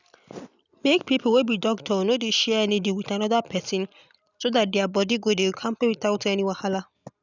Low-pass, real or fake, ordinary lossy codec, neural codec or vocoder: 7.2 kHz; real; none; none